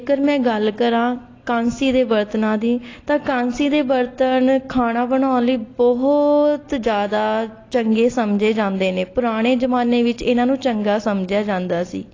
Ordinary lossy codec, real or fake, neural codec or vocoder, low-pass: AAC, 32 kbps; real; none; 7.2 kHz